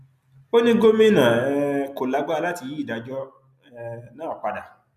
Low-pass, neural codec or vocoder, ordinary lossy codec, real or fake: 14.4 kHz; none; none; real